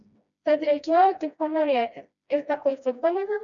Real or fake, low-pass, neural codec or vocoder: fake; 7.2 kHz; codec, 16 kHz, 1 kbps, FreqCodec, smaller model